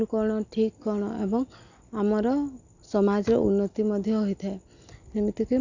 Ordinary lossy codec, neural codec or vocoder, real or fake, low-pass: none; none; real; 7.2 kHz